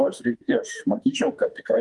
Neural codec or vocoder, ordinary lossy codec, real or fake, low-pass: autoencoder, 48 kHz, 32 numbers a frame, DAC-VAE, trained on Japanese speech; Opus, 24 kbps; fake; 10.8 kHz